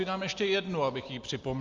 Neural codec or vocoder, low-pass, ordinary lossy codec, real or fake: none; 7.2 kHz; Opus, 32 kbps; real